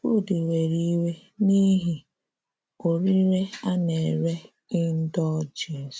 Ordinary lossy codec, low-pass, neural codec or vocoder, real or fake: none; none; none; real